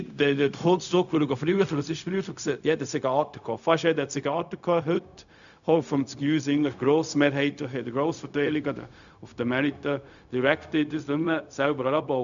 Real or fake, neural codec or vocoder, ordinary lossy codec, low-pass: fake; codec, 16 kHz, 0.4 kbps, LongCat-Audio-Codec; none; 7.2 kHz